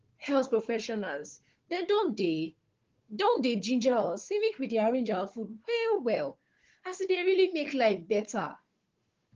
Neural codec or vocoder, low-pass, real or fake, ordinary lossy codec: codec, 16 kHz, 4 kbps, FunCodec, trained on Chinese and English, 50 frames a second; 7.2 kHz; fake; Opus, 16 kbps